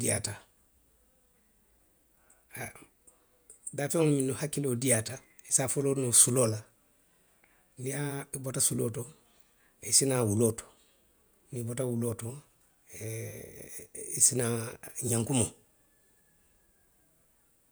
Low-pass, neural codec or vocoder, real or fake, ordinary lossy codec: none; vocoder, 48 kHz, 128 mel bands, Vocos; fake; none